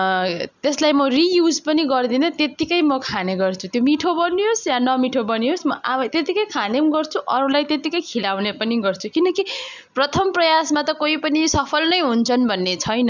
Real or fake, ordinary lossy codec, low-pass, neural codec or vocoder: real; none; 7.2 kHz; none